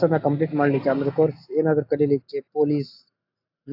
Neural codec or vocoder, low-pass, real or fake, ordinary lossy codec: none; 5.4 kHz; real; AAC, 48 kbps